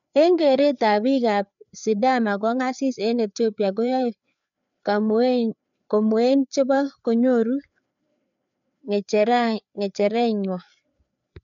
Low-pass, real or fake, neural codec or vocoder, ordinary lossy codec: 7.2 kHz; fake; codec, 16 kHz, 4 kbps, FreqCodec, larger model; MP3, 96 kbps